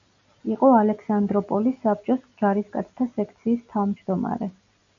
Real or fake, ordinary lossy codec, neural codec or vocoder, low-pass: real; MP3, 64 kbps; none; 7.2 kHz